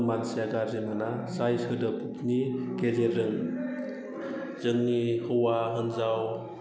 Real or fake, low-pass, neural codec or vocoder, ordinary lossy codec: real; none; none; none